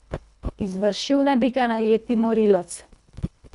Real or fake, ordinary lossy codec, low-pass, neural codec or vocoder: fake; none; 10.8 kHz; codec, 24 kHz, 1.5 kbps, HILCodec